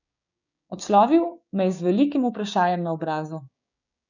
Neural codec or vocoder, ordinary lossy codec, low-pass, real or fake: codec, 16 kHz, 6 kbps, DAC; none; 7.2 kHz; fake